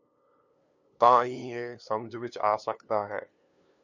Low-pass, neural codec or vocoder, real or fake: 7.2 kHz; codec, 16 kHz, 2 kbps, FunCodec, trained on LibriTTS, 25 frames a second; fake